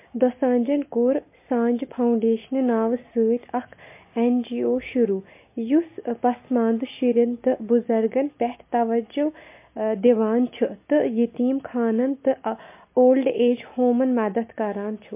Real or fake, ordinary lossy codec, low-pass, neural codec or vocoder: real; MP3, 24 kbps; 3.6 kHz; none